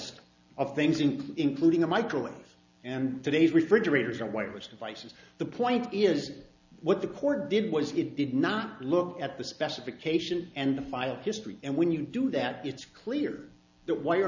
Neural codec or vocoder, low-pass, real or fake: none; 7.2 kHz; real